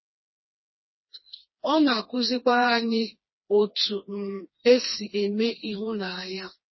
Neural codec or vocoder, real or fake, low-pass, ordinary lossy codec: codec, 16 kHz, 2 kbps, FreqCodec, smaller model; fake; 7.2 kHz; MP3, 24 kbps